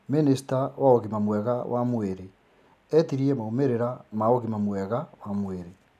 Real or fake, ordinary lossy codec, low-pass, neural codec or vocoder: real; none; 14.4 kHz; none